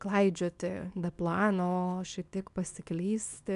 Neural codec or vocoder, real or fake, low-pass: codec, 24 kHz, 0.9 kbps, WavTokenizer, small release; fake; 10.8 kHz